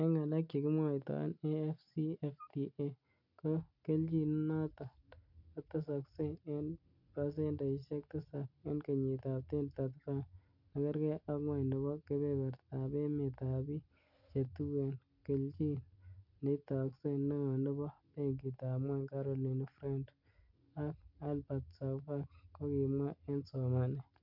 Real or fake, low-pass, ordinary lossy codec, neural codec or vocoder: real; 5.4 kHz; none; none